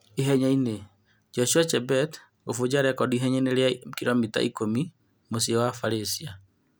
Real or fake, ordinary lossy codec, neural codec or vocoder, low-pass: real; none; none; none